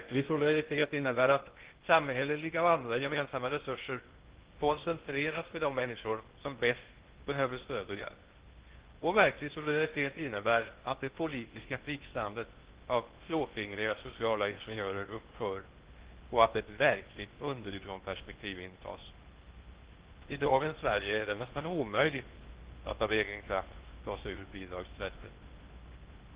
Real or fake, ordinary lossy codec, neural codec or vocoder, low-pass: fake; Opus, 16 kbps; codec, 16 kHz in and 24 kHz out, 0.6 kbps, FocalCodec, streaming, 2048 codes; 3.6 kHz